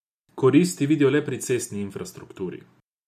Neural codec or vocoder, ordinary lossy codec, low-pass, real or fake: none; none; 14.4 kHz; real